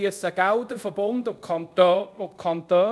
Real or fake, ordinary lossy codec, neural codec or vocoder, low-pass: fake; none; codec, 24 kHz, 0.5 kbps, DualCodec; none